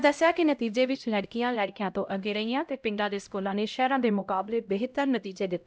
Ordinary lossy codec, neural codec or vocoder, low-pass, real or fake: none; codec, 16 kHz, 0.5 kbps, X-Codec, HuBERT features, trained on LibriSpeech; none; fake